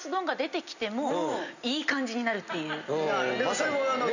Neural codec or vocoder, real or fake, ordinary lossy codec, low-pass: none; real; none; 7.2 kHz